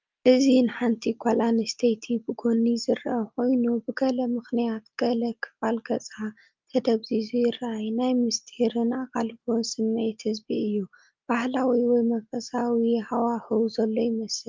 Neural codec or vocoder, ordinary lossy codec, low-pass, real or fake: none; Opus, 24 kbps; 7.2 kHz; real